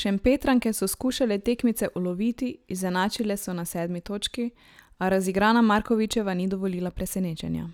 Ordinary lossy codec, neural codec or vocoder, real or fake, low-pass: none; none; real; 19.8 kHz